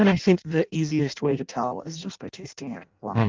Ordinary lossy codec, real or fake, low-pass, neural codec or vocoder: Opus, 24 kbps; fake; 7.2 kHz; codec, 16 kHz in and 24 kHz out, 0.6 kbps, FireRedTTS-2 codec